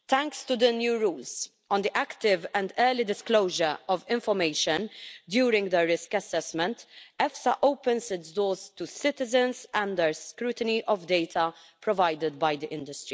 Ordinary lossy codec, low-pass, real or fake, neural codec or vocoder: none; none; real; none